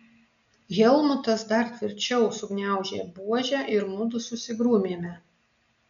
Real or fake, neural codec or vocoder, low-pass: real; none; 7.2 kHz